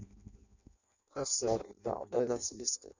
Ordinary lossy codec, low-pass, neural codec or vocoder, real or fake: none; 7.2 kHz; codec, 16 kHz in and 24 kHz out, 0.6 kbps, FireRedTTS-2 codec; fake